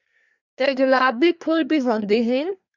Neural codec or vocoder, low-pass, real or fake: codec, 24 kHz, 1 kbps, SNAC; 7.2 kHz; fake